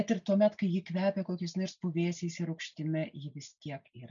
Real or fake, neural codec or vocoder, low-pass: real; none; 7.2 kHz